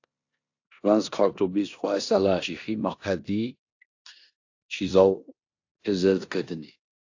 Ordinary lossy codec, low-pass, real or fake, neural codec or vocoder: AAC, 48 kbps; 7.2 kHz; fake; codec, 16 kHz in and 24 kHz out, 0.9 kbps, LongCat-Audio-Codec, four codebook decoder